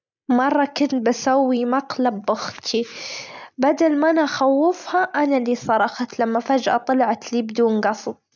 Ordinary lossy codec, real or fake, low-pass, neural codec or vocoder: none; real; 7.2 kHz; none